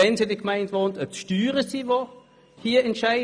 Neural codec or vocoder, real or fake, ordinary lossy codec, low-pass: none; real; none; 9.9 kHz